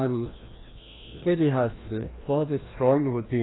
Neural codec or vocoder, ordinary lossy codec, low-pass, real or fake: codec, 16 kHz, 1 kbps, FreqCodec, larger model; AAC, 16 kbps; 7.2 kHz; fake